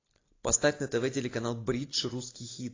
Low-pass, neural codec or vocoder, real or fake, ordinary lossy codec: 7.2 kHz; none; real; AAC, 32 kbps